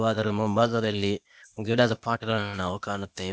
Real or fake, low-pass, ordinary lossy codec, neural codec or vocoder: fake; none; none; codec, 16 kHz, 0.8 kbps, ZipCodec